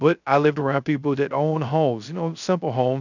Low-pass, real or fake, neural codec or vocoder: 7.2 kHz; fake; codec, 16 kHz, 0.3 kbps, FocalCodec